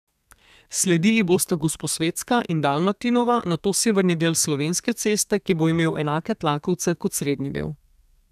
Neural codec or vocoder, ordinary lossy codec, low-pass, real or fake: codec, 32 kHz, 1.9 kbps, SNAC; none; 14.4 kHz; fake